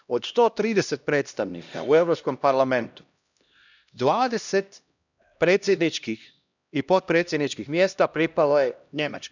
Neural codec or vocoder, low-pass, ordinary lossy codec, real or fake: codec, 16 kHz, 1 kbps, X-Codec, HuBERT features, trained on LibriSpeech; 7.2 kHz; none; fake